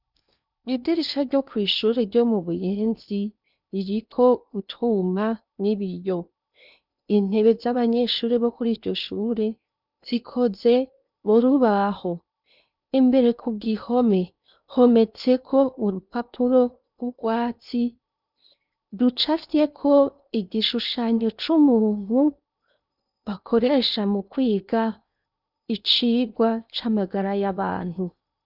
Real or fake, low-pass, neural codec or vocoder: fake; 5.4 kHz; codec, 16 kHz in and 24 kHz out, 0.8 kbps, FocalCodec, streaming, 65536 codes